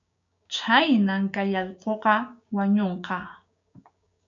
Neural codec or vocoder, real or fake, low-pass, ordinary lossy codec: codec, 16 kHz, 6 kbps, DAC; fake; 7.2 kHz; AAC, 64 kbps